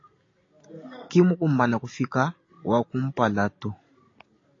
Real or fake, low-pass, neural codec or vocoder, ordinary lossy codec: real; 7.2 kHz; none; AAC, 32 kbps